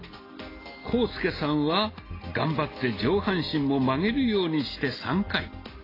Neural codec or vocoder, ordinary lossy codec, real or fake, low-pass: none; AAC, 24 kbps; real; 5.4 kHz